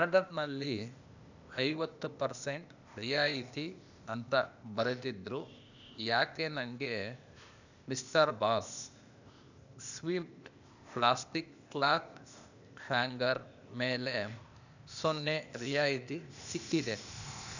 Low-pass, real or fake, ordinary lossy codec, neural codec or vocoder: 7.2 kHz; fake; none; codec, 16 kHz, 0.8 kbps, ZipCodec